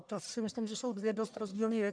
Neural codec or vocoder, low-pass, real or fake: codec, 44.1 kHz, 1.7 kbps, Pupu-Codec; 9.9 kHz; fake